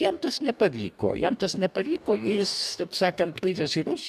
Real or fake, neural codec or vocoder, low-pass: fake; codec, 44.1 kHz, 2.6 kbps, DAC; 14.4 kHz